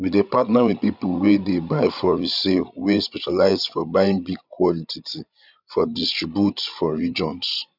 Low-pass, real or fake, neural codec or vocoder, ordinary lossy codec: 5.4 kHz; fake; codec, 16 kHz, 16 kbps, FreqCodec, larger model; none